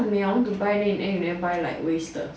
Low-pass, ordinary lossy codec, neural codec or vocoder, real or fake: none; none; none; real